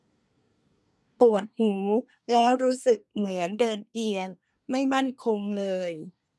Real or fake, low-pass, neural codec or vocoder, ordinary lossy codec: fake; none; codec, 24 kHz, 1 kbps, SNAC; none